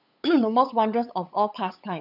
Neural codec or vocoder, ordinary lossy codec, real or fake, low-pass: codec, 16 kHz, 8 kbps, FunCodec, trained on LibriTTS, 25 frames a second; none; fake; 5.4 kHz